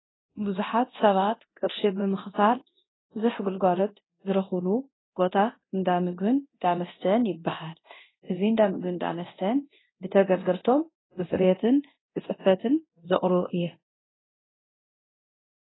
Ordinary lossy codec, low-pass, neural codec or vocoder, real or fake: AAC, 16 kbps; 7.2 kHz; codec, 24 kHz, 0.9 kbps, DualCodec; fake